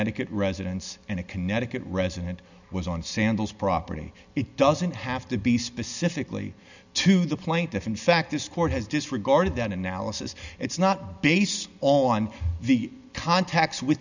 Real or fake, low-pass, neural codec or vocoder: real; 7.2 kHz; none